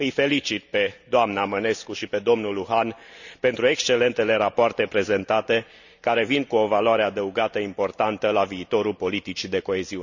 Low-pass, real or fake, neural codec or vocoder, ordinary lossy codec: 7.2 kHz; real; none; none